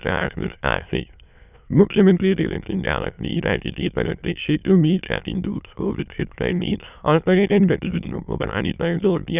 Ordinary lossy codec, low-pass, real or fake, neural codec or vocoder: none; 3.6 kHz; fake; autoencoder, 22.05 kHz, a latent of 192 numbers a frame, VITS, trained on many speakers